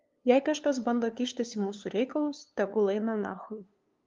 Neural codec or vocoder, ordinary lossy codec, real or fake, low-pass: codec, 16 kHz, 2 kbps, FunCodec, trained on LibriTTS, 25 frames a second; Opus, 32 kbps; fake; 7.2 kHz